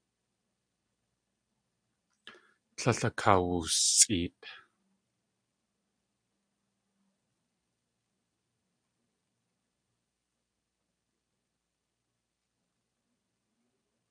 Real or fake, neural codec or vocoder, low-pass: real; none; 9.9 kHz